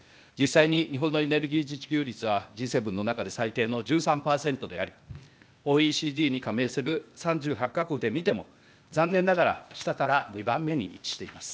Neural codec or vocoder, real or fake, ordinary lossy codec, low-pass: codec, 16 kHz, 0.8 kbps, ZipCodec; fake; none; none